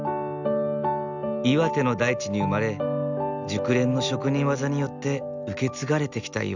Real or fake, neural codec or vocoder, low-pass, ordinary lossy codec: real; none; 7.2 kHz; none